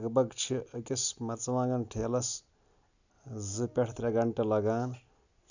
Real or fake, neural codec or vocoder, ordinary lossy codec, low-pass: real; none; none; 7.2 kHz